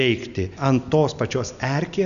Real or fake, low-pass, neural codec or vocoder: real; 7.2 kHz; none